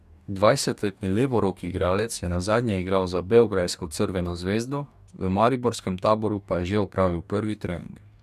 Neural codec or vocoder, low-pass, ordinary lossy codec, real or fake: codec, 44.1 kHz, 2.6 kbps, DAC; 14.4 kHz; none; fake